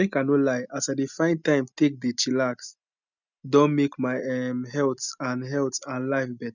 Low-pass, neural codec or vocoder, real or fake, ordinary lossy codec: 7.2 kHz; none; real; none